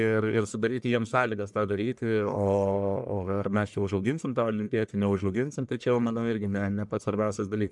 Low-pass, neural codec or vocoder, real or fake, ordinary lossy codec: 10.8 kHz; codec, 44.1 kHz, 1.7 kbps, Pupu-Codec; fake; MP3, 96 kbps